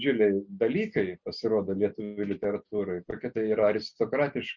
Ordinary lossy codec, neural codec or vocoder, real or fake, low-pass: Opus, 64 kbps; none; real; 7.2 kHz